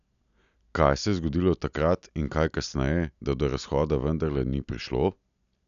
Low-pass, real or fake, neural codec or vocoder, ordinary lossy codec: 7.2 kHz; real; none; none